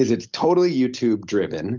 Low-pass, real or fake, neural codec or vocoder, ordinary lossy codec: 7.2 kHz; real; none; Opus, 32 kbps